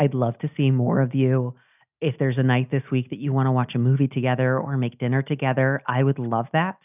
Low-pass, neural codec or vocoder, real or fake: 3.6 kHz; none; real